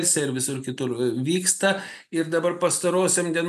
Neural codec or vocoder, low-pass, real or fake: none; 14.4 kHz; real